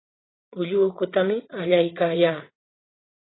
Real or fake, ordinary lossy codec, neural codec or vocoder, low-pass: fake; AAC, 16 kbps; vocoder, 44.1 kHz, 80 mel bands, Vocos; 7.2 kHz